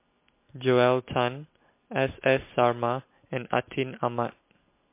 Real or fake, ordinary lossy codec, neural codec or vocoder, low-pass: real; MP3, 24 kbps; none; 3.6 kHz